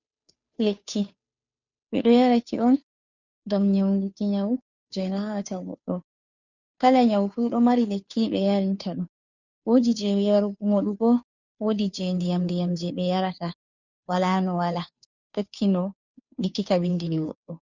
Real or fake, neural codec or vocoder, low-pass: fake; codec, 16 kHz, 2 kbps, FunCodec, trained on Chinese and English, 25 frames a second; 7.2 kHz